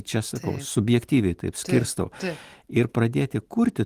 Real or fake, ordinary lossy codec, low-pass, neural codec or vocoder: real; Opus, 24 kbps; 14.4 kHz; none